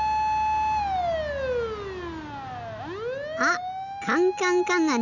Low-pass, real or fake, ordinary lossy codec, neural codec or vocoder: 7.2 kHz; real; none; none